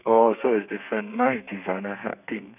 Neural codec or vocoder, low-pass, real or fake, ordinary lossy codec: codec, 32 kHz, 1.9 kbps, SNAC; 3.6 kHz; fake; none